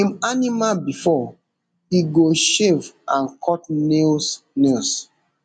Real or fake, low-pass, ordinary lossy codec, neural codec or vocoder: real; 9.9 kHz; none; none